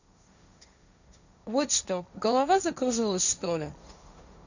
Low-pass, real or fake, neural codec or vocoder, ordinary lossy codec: 7.2 kHz; fake; codec, 16 kHz, 1.1 kbps, Voila-Tokenizer; none